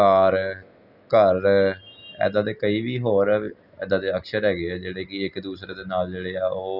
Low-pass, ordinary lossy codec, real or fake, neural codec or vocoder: 5.4 kHz; none; real; none